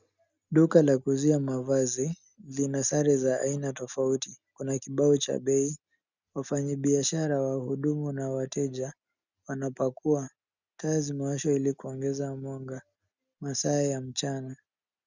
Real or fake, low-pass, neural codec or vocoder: real; 7.2 kHz; none